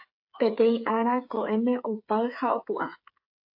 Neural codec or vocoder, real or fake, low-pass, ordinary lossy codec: codec, 16 kHz, 8 kbps, FreqCodec, smaller model; fake; 5.4 kHz; AAC, 48 kbps